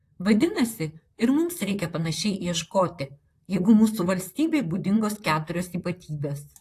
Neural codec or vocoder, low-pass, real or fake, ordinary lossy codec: vocoder, 44.1 kHz, 128 mel bands, Pupu-Vocoder; 14.4 kHz; fake; AAC, 64 kbps